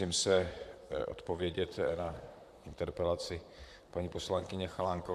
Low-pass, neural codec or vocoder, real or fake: 14.4 kHz; vocoder, 44.1 kHz, 128 mel bands, Pupu-Vocoder; fake